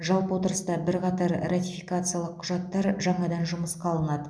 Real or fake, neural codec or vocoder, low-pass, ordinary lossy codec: real; none; none; none